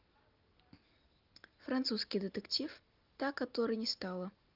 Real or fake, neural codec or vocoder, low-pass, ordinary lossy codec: real; none; 5.4 kHz; Opus, 32 kbps